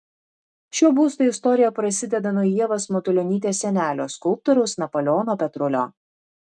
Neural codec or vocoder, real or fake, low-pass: none; real; 10.8 kHz